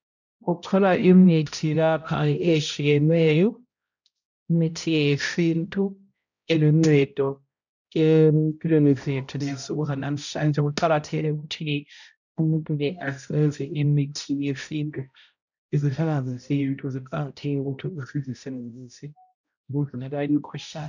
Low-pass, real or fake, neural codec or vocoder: 7.2 kHz; fake; codec, 16 kHz, 0.5 kbps, X-Codec, HuBERT features, trained on balanced general audio